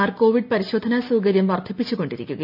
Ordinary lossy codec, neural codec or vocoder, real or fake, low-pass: MP3, 48 kbps; none; real; 5.4 kHz